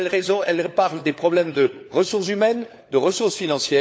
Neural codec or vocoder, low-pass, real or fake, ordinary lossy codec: codec, 16 kHz, 4 kbps, FunCodec, trained on LibriTTS, 50 frames a second; none; fake; none